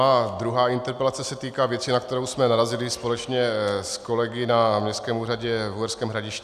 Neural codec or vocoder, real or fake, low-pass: none; real; 14.4 kHz